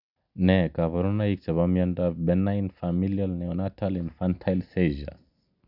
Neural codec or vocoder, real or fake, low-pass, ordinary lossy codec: none; real; 5.4 kHz; none